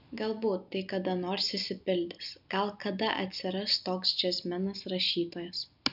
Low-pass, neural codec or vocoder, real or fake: 5.4 kHz; none; real